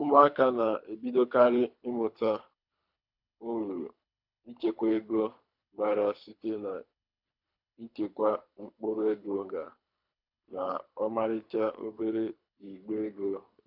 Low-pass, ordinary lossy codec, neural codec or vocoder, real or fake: 5.4 kHz; MP3, 48 kbps; codec, 24 kHz, 3 kbps, HILCodec; fake